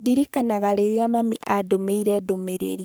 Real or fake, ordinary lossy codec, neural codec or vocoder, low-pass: fake; none; codec, 44.1 kHz, 3.4 kbps, Pupu-Codec; none